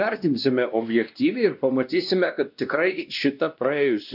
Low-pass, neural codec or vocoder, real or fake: 5.4 kHz; codec, 16 kHz, 1 kbps, X-Codec, WavLM features, trained on Multilingual LibriSpeech; fake